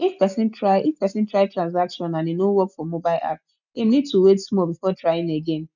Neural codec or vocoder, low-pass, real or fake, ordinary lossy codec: none; 7.2 kHz; real; none